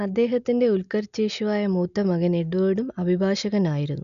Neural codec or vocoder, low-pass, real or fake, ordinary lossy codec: none; 7.2 kHz; real; none